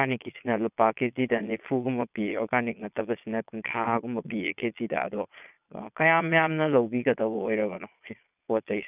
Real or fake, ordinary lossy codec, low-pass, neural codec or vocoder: fake; none; 3.6 kHz; vocoder, 22.05 kHz, 80 mel bands, Vocos